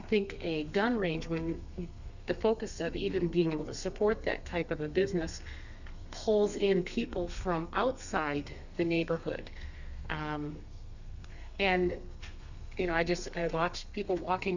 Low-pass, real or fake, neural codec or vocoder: 7.2 kHz; fake; codec, 32 kHz, 1.9 kbps, SNAC